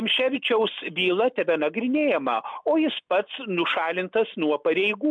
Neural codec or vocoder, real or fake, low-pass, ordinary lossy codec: none; real; 9.9 kHz; MP3, 64 kbps